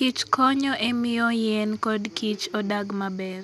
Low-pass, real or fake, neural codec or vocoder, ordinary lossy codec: 14.4 kHz; real; none; none